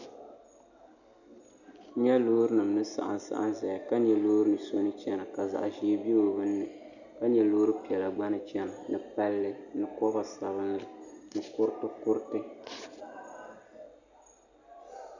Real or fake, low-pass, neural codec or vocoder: real; 7.2 kHz; none